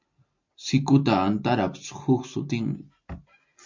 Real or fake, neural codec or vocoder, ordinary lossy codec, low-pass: real; none; MP3, 48 kbps; 7.2 kHz